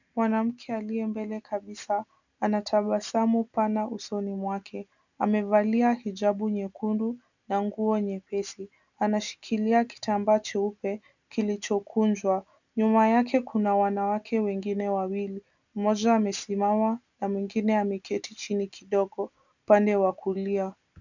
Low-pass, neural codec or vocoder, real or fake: 7.2 kHz; none; real